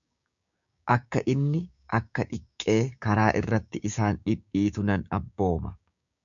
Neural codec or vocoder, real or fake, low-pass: codec, 16 kHz, 6 kbps, DAC; fake; 7.2 kHz